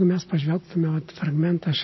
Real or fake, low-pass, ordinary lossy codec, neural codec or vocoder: real; 7.2 kHz; MP3, 24 kbps; none